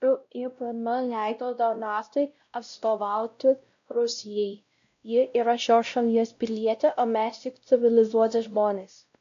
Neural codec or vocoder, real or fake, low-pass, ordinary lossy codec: codec, 16 kHz, 0.5 kbps, X-Codec, WavLM features, trained on Multilingual LibriSpeech; fake; 7.2 kHz; AAC, 64 kbps